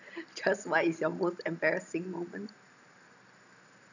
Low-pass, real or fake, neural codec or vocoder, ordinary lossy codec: 7.2 kHz; real; none; none